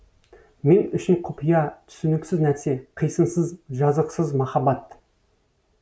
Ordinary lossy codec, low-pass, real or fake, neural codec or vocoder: none; none; real; none